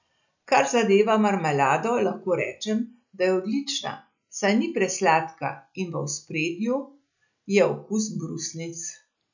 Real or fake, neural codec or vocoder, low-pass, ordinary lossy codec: real; none; 7.2 kHz; none